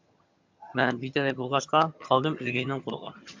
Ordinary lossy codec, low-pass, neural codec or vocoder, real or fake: MP3, 64 kbps; 7.2 kHz; vocoder, 22.05 kHz, 80 mel bands, HiFi-GAN; fake